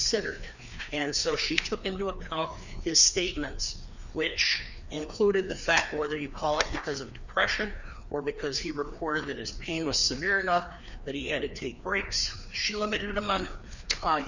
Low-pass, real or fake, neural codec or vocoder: 7.2 kHz; fake; codec, 16 kHz, 2 kbps, FreqCodec, larger model